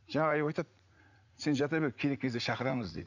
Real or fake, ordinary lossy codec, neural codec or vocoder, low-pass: fake; none; vocoder, 44.1 kHz, 80 mel bands, Vocos; 7.2 kHz